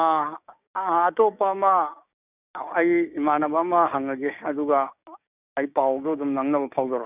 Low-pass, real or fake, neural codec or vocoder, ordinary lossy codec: 3.6 kHz; fake; codec, 16 kHz, 6 kbps, DAC; none